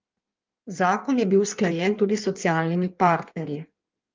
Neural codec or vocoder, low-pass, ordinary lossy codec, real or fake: codec, 16 kHz in and 24 kHz out, 1.1 kbps, FireRedTTS-2 codec; 7.2 kHz; Opus, 32 kbps; fake